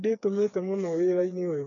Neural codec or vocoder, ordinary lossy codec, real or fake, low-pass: codec, 16 kHz, 4 kbps, FreqCodec, smaller model; none; fake; 7.2 kHz